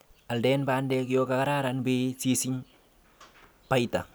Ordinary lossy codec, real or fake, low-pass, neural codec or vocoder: none; real; none; none